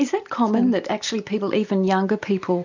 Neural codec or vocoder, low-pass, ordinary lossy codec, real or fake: none; 7.2 kHz; MP3, 64 kbps; real